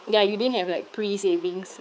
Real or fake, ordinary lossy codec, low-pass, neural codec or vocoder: fake; none; none; codec, 16 kHz, 4 kbps, X-Codec, HuBERT features, trained on balanced general audio